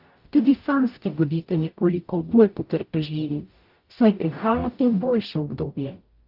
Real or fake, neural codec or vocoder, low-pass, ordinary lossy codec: fake; codec, 44.1 kHz, 0.9 kbps, DAC; 5.4 kHz; Opus, 24 kbps